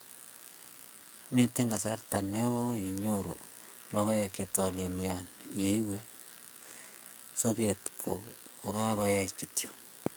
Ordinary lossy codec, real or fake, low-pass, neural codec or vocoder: none; fake; none; codec, 44.1 kHz, 2.6 kbps, SNAC